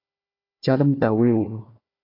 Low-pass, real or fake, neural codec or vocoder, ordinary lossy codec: 5.4 kHz; fake; codec, 16 kHz, 1 kbps, FunCodec, trained on Chinese and English, 50 frames a second; AAC, 24 kbps